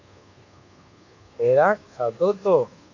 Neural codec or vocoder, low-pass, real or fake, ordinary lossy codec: codec, 24 kHz, 1.2 kbps, DualCodec; 7.2 kHz; fake; AAC, 48 kbps